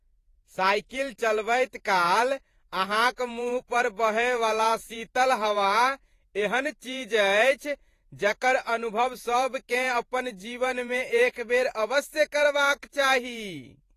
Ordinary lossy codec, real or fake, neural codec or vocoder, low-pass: AAC, 48 kbps; fake; vocoder, 48 kHz, 128 mel bands, Vocos; 14.4 kHz